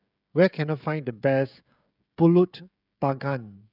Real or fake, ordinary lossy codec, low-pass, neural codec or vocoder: fake; none; 5.4 kHz; codec, 16 kHz, 16 kbps, FreqCodec, smaller model